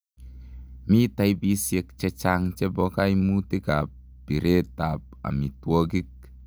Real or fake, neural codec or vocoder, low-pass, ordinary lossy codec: real; none; none; none